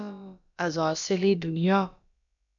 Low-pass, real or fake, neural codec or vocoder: 7.2 kHz; fake; codec, 16 kHz, about 1 kbps, DyCAST, with the encoder's durations